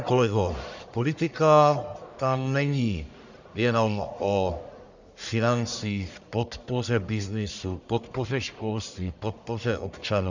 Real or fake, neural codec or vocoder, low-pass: fake; codec, 44.1 kHz, 1.7 kbps, Pupu-Codec; 7.2 kHz